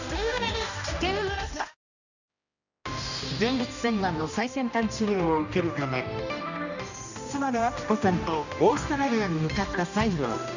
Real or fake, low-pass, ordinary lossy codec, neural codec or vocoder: fake; 7.2 kHz; none; codec, 16 kHz, 1 kbps, X-Codec, HuBERT features, trained on general audio